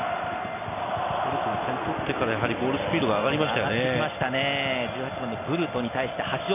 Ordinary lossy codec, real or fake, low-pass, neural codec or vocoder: MP3, 32 kbps; real; 3.6 kHz; none